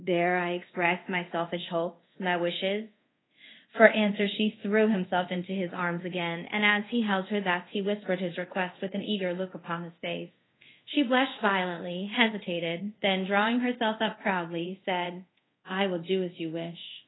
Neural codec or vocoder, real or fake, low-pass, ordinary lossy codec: codec, 24 kHz, 0.5 kbps, DualCodec; fake; 7.2 kHz; AAC, 16 kbps